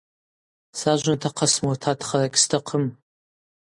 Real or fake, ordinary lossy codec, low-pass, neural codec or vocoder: real; MP3, 64 kbps; 10.8 kHz; none